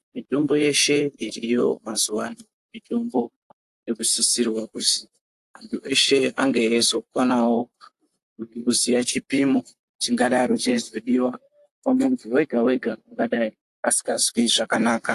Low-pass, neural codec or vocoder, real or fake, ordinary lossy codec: 14.4 kHz; vocoder, 44.1 kHz, 128 mel bands, Pupu-Vocoder; fake; AAC, 64 kbps